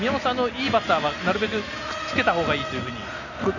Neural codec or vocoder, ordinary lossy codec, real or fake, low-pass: none; none; real; 7.2 kHz